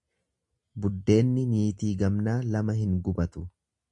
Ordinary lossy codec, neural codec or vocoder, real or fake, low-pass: MP3, 64 kbps; none; real; 10.8 kHz